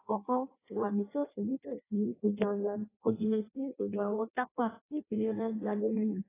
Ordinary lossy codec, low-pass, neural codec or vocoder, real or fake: AAC, 16 kbps; 3.6 kHz; codec, 16 kHz in and 24 kHz out, 0.6 kbps, FireRedTTS-2 codec; fake